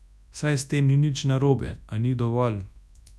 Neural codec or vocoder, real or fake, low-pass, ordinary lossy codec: codec, 24 kHz, 0.9 kbps, WavTokenizer, large speech release; fake; none; none